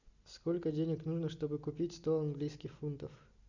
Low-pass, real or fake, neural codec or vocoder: 7.2 kHz; real; none